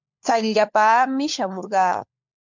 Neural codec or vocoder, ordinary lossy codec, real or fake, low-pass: codec, 16 kHz, 4 kbps, FunCodec, trained on LibriTTS, 50 frames a second; MP3, 64 kbps; fake; 7.2 kHz